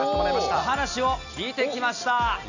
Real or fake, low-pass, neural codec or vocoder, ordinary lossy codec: real; 7.2 kHz; none; none